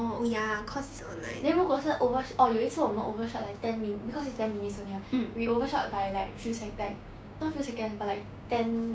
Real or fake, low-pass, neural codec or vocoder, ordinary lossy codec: fake; none; codec, 16 kHz, 6 kbps, DAC; none